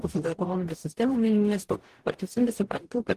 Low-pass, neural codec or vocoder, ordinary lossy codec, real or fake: 14.4 kHz; codec, 44.1 kHz, 0.9 kbps, DAC; Opus, 16 kbps; fake